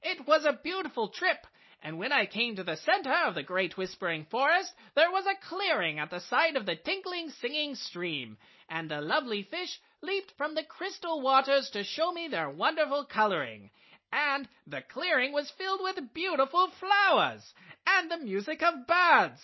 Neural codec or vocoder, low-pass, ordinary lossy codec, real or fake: none; 7.2 kHz; MP3, 24 kbps; real